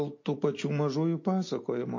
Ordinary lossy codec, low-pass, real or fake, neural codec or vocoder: MP3, 32 kbps; 7.2 kHz; real; none